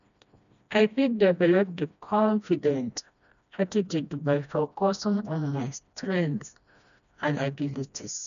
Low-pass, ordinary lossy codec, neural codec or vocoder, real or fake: 7.2 kHz; none; codec, 16 kHz, 1 kbps, FreqCodec, smaller model; fake